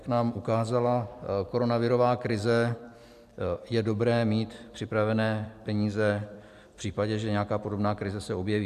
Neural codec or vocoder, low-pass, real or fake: vocoder, 44.1 kHz, 128 mel bands every 256 samples, BigVGAN v2; 14.4 kHz; fake